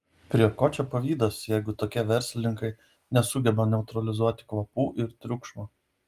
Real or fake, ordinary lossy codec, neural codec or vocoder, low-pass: real; Opus, 32 kbps; none; 14.4 kHz